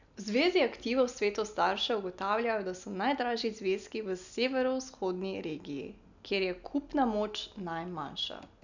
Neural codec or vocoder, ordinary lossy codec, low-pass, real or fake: none; none; 7.2 kHz; real